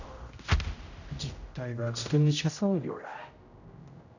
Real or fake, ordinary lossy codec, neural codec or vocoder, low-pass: fake; none; codec, 16 kHz, 0.5 kbps, X-Codec, HuBERT features, trained on general audio; 7.2 kHz